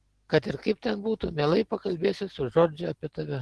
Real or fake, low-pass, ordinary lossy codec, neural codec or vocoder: real; 10.8 kHz; Opus, 16 kbps; none